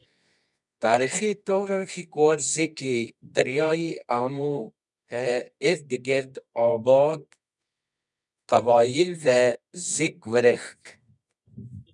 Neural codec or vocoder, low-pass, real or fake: codec, 24 kHz, 0.9 kbps, WavTokenizer, medium music audio release; 10.8 kHz; fake